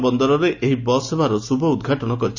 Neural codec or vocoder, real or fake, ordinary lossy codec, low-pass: none; real; Opus, 64 kbps; 7.2 kHz